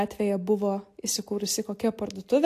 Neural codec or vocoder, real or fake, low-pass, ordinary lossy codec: none; real; 14.4 kHz; AAC, 64 kbps